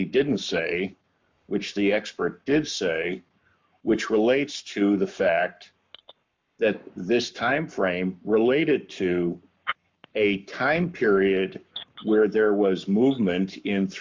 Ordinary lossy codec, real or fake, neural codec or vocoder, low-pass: MP3, 64 kbps; fake; codec, 44.1 kHz, 7.8 kbps, Pupu-Codec; 7.2 kHz